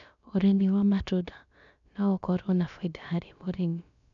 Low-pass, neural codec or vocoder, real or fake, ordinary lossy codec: 7.2 kHz; codec, 16 kHz, about 1 kbps, DyCAST, with the encoder's durations; fake; none